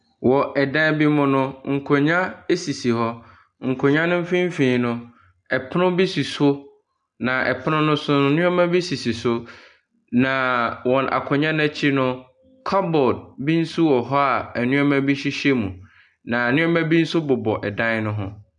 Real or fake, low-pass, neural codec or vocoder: real; 9.9 kHz; none